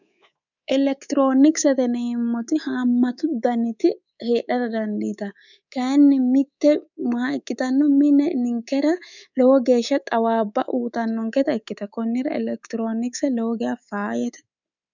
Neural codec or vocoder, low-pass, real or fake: codec, 24 kHz, 3.1 kbps, DualCodec; 7.2 kHz; fake